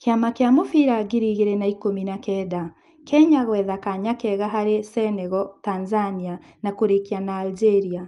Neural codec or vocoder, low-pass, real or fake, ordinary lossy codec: none; 10.8 kHz; real; Opus, 32 kbps